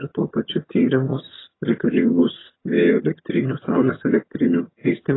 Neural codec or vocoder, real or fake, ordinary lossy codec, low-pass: vocoder, 22.05 kHz, 80 mel bands, HiFi-GAN; fake; AAC, 16 kbps; 7.2 kHz